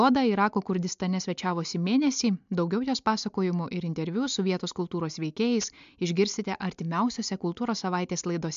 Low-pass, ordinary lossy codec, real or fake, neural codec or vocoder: 7.2 kHz; MP3, 64 kbps; real; none